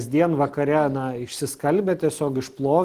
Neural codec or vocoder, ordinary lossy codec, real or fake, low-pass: none; Opus, 24 kbps; real; 14.4 kHz